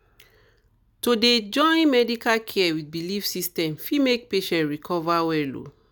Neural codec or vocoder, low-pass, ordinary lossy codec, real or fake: none; none; none; real